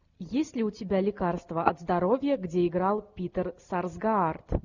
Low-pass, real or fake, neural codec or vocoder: 7.2 kHz; real; none